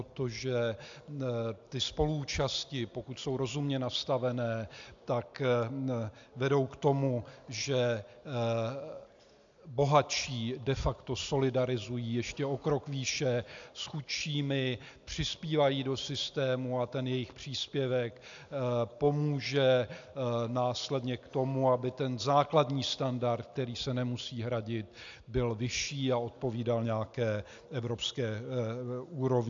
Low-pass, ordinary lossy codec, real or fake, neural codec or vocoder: 7.2 kHz; AAC, 64 kbps; real; none